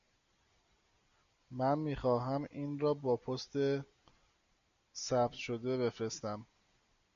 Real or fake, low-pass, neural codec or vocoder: real; 7.2 kHz; none